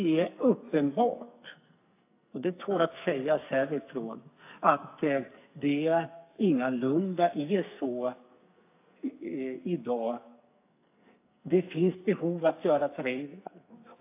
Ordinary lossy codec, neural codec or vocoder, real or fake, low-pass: AAC, 24 kbps; codec, 44.1 kHz, 2.6 kbps, SNAC; fake; 3.6 kHz